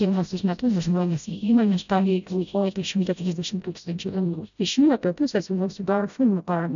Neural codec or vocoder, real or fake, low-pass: codec, 16 kHz, 0.5 kbps, FreqCodec, smaller model; fake; 7.2 kHz